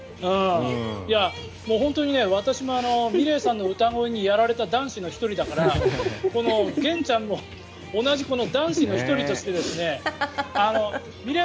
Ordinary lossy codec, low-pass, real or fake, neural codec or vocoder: none; none; real; none